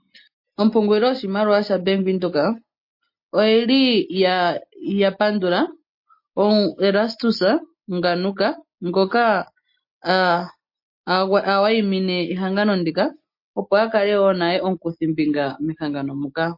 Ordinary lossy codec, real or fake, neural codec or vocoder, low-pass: MP3, 32 kbps; real; none; 5.4 kHz